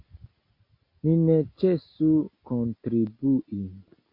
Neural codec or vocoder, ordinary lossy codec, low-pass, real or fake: none; MP3, 32 kbps; 5.4 kHz; real